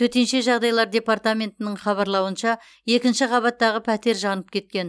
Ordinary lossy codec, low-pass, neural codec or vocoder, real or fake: none; none; none; real